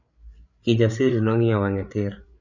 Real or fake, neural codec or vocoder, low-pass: fake; codec, 16 kHz, 8 kbps, FreqCodec, larger model; 7.2 kHz